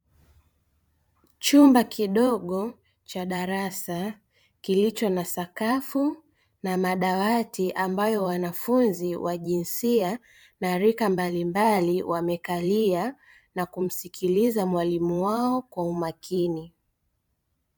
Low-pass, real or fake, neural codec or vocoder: 19.8 kHz; fake; vocoder, 44.1 kHz, 128 mel bands every 512 samples, BigVGAN v2